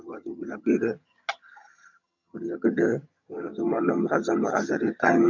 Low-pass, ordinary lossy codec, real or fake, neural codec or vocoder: 7.2 kHz; none; fake; vocoder, 22.05 kHz, 80 mel bands, HiFi-GAN